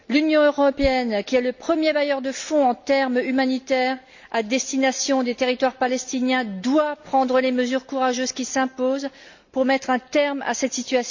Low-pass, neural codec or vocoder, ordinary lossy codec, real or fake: 7.2 kHz; none; Opus, 64 kbps; real